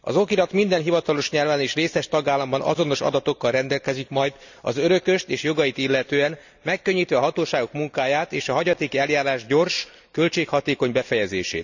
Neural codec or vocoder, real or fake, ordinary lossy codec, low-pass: none; real; none; 7.2 kHz